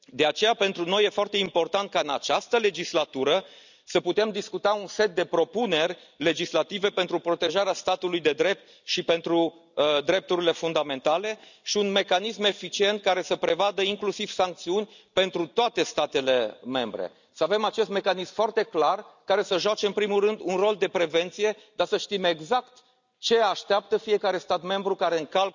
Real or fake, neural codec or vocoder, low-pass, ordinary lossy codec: real; none; 7.2 kHz; none